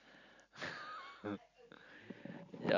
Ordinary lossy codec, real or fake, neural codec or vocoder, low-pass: none; real; none; 7.2 kHz